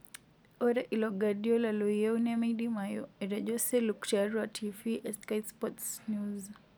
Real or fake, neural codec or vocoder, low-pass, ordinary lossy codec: real; none; none; none